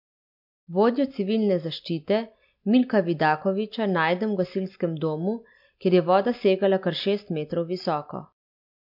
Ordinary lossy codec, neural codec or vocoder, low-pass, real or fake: MP3, 48 kbps; none; 5.4 kHz; real